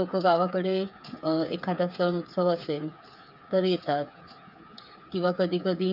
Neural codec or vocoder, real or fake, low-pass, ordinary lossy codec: codec, 16 kHz, 8 kbps, FreqCodec, smaller model; fake; 5.4 kHz; none